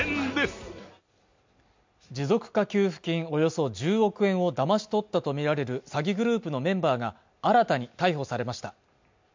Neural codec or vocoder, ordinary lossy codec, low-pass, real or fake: none; MP3, 64 kbps; 7.2 kHz; real